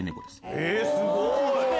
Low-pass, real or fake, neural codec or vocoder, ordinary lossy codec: none; real; none; none